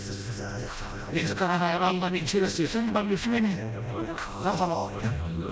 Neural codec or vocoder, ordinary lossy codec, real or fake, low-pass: codec, 16 kHz, 0.5 kbps, FreqCodec, smaller model; none; fake; none